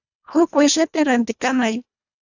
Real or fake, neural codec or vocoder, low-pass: fake; codec, 24 kHz, 1.5 kbps, HILCodec; 7.2 kHz